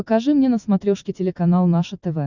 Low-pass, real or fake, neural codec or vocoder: 7.2 kHz; real; none